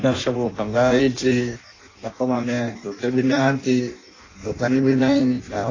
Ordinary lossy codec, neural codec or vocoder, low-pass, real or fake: AAC, 32 kbps; codec, 16 kHz in and 24 kHz out, 0.6 kbps, FireRedTTS-2 codec; 7.2 kHz; fake